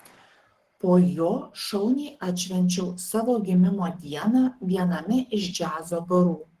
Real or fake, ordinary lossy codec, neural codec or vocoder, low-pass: fake; Opus, 16 kbps; codec, 44.1 kHz, 7.8 kbps, Pupu-Codec; 14.4 kHz